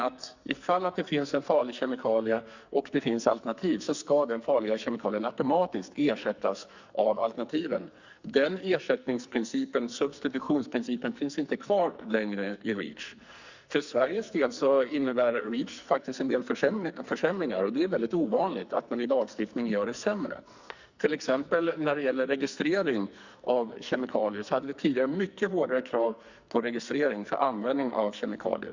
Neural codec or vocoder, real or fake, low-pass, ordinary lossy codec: codec, 44.1 kHz, 2.6 kbps, SNAC; fake; 7.2 kHz; Opus, 64 kbps